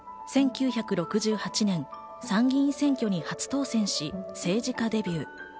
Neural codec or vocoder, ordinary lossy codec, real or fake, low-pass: none; none; real; none